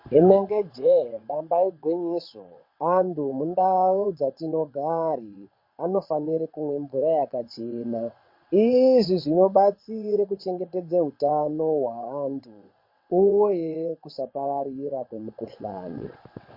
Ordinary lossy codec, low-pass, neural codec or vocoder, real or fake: MP3, 32 kbps; 5.4 kHz; vocoder, 22.05 kHz, 80 mel bands, WaveNeXt; fake